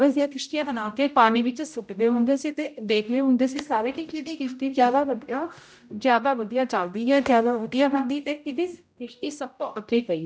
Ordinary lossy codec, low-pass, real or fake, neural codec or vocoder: none; none; fake; codec, 16 kHz, 0.5 kbps, X-Codec, HuBERT features, trained on general audio